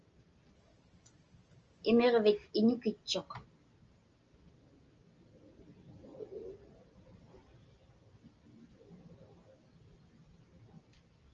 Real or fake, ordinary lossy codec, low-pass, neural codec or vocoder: real; Opus, 24 kbps; 7.2 kHz; none